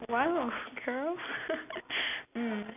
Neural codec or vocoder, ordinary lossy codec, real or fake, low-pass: none; none; real; 3.6 kHz